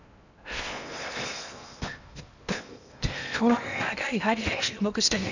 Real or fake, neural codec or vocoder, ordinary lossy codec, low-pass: fake; codec, 16 kHz in and 24 kHz out, 0.8 kbps, FocalCodec, streaming, 65536 codes; none; 7.2 kHz